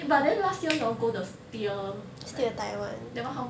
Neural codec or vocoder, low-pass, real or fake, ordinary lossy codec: none; none; real; none